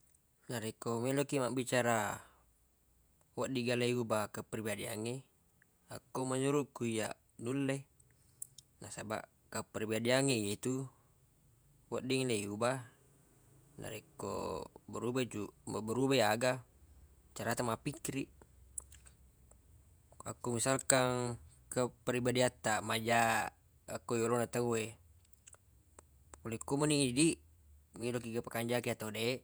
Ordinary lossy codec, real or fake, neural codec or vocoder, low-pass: none; fake; vocoder, 48 kHz, 128 mel bands, Vocos; none